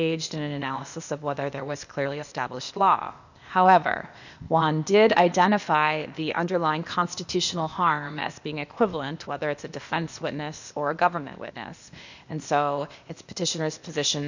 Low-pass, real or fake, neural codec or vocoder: 7.2 kHz; fake; codec, 16 kHz, 0.8 kbps, ZipCodec